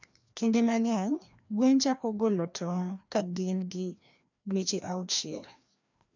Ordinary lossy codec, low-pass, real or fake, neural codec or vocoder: none; 7.2 kHz; fake; codec, 16 kHz, 1 kbps, FreqCodec, larger model